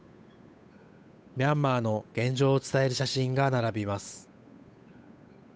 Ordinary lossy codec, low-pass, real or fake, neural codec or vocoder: none; none; fake; codec, 16 kHz, 8 kbps, FunCodec, trained on Chinese and English, 25 frames a second